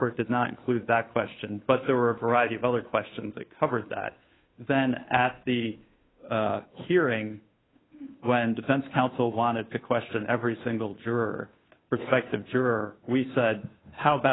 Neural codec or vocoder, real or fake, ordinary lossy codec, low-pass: codec, 16 kHz, 2 kbps, FunCodec, trained on Chinese and English, 25 frames a second; fake; AAC, 16 kbps; 7.2 kHz